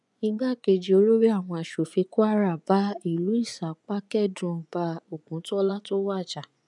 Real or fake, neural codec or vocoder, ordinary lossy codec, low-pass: fake; vocoder, 24 kHz, 100 mel bands, Vocos; none; 10.8 kHz